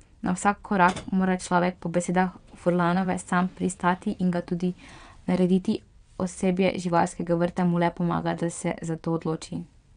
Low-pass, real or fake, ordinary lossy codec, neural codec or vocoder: 9.9 kHz; fake; none; vocoder, 22.05 kHz, 80 mel bands, WaveNeXt